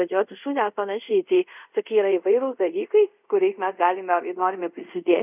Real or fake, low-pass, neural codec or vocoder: fake; 3.6 kHz; codec, 24 kHz, 0.5 kbps, DualCodec